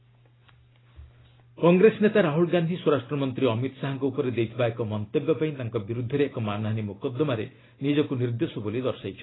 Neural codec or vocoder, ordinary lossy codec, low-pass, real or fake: none; AAC, 16 kbps; 7.2 kHz; real